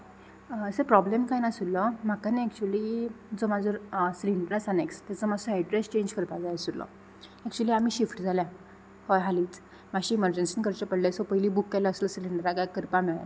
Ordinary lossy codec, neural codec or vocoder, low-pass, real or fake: none; none; none; real